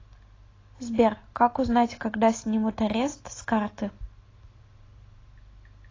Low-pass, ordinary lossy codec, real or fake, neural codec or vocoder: 7.2 kHz; AAC, 32 kbps; real; none